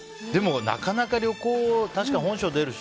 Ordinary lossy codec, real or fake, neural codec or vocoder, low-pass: none; real; none; none